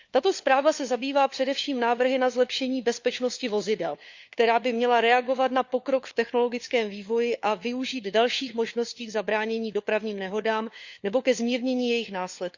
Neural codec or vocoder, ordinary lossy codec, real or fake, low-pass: codec, 16 kHz, 4 kbps, FunCodec, trained on LibriTTS, 50 frames a second; Opus, 64 kbps; fake; 7.2 kHz